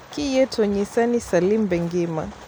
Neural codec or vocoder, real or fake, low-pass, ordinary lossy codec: none; real; none; none